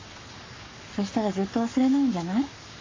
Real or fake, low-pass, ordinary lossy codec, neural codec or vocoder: fake; 7.2 kHz; AAC, 32 kbps; codec, 44.1 kHz, 7.8 kbps, Pupu-Codec